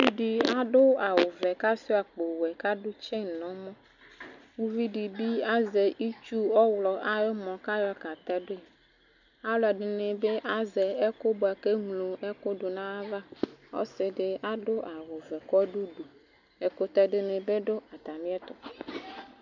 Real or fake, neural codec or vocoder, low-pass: real; none; 7.2 kHz